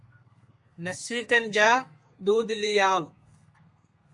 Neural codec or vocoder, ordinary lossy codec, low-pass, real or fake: codec, 32 kHz, 1.9 kbps, SNAC; MP3, 64 kbps; 10.8 kHz; fake